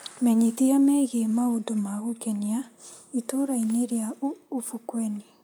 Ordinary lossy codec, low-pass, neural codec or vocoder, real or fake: none; none; vocoder, 44.1 kHz, 128 mel bands, Pupu-Vocoder; fake